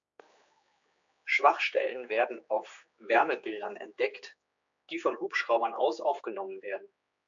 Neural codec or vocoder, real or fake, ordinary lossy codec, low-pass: codec, 16 kHz, 4 kbps, X-Codec, HuBERT features, trained on general audio; fake; AAC, 64 kbps; 7.2 kHz